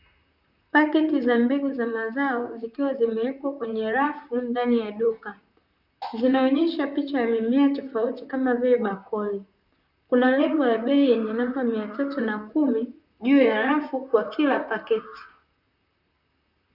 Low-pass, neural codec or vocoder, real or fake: 5.4 kHz; vocoder, 44.1 kHz, 128 mel bands, Pupu-Vocoder; fake